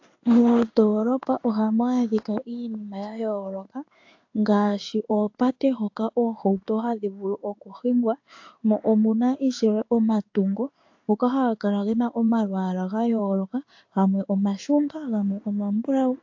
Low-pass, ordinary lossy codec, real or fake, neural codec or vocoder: 7.2 kHz; AAC, 48 kbps; fake; codec, 16 kHz in and 24 kHz out, 1 kbps, XY-Tokenizer